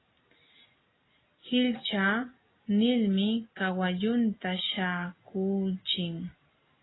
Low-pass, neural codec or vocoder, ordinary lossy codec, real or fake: 7.2 kHz; none; AAC, 16 kbps; real